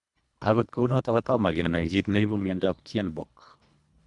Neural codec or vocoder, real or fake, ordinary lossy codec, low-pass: codec, 24 kHz, 1.5 kbps, HILCodec; fake; none; 10.8 kHz